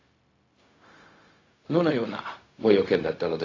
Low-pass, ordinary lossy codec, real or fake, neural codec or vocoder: 7.2 kHz; AAC, 32 kbps; fake; codec, 16 kHz, 0.4 kbps, LongCat-Audio-Codec